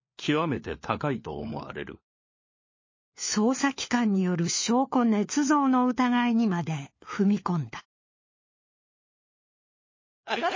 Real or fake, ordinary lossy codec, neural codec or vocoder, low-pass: fake; MP3, 32 kbps; codec, 16 kHz, 4 kbps, FunCodec, trained on LibriTTS, 50 frames a second; 7.2 kHz